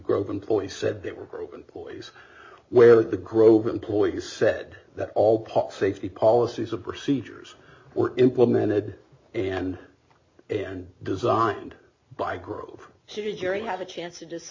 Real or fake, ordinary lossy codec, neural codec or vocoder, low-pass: real; MP3, 32 kbps; none; 7.2 kHz